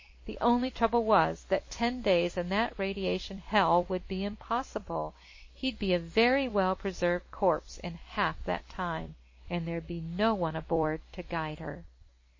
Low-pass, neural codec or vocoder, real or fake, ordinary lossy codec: 7.2 kHz; vocoder, 44.1 kHz, 128 mel bands every 256 samples, BigVGAN v2; fake; MP3, 32 kbps